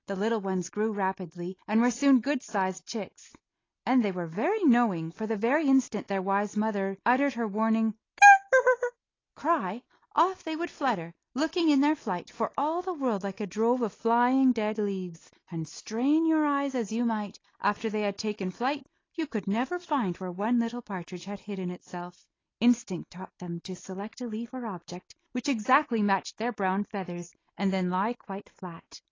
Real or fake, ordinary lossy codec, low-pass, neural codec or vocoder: real; AAC, 32 kbps; 7.2 kHz; none